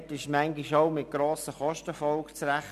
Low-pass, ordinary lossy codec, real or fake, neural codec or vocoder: 14.4 kHz; none; real; none